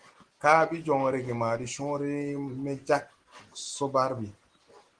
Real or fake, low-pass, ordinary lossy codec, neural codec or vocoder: real; 9.9 kHz; Opus, 16 kbps; none